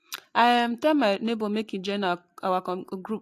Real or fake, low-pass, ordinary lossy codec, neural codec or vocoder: real; 14.4 kHz; AAC, 64 kbps; none